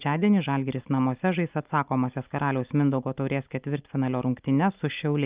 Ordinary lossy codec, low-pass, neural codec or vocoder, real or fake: Opus, 24 kbps; 3.6 kHz; none; real